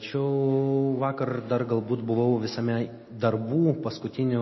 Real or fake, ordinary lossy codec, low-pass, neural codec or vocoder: real; MP3, 24 kbps; 7.2 kHz; none